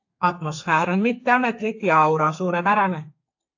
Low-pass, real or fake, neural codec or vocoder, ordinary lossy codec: 7.2 kHz; fake; codec, 32 kHz, 1.9 kbps, SNAC; AAC, 48 kbps